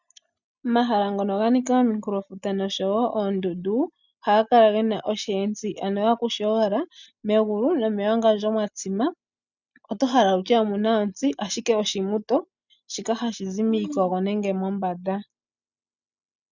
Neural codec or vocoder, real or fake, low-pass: none; real; 7.2 kHz